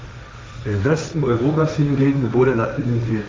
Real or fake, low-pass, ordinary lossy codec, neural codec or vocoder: fake; none; none; codec, 16 kHz, 1.1 kbps, Voila-Tokenizer